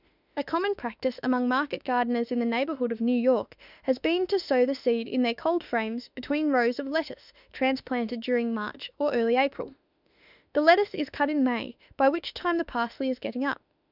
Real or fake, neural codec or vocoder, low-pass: fake; autoencoder, 48 kHz, 32 numbers a frame, DAC-VAE, trained on Japanese speech; 5.4 kHz